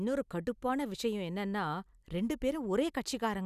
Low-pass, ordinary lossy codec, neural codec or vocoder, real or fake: 14.4 kHz; none; none; real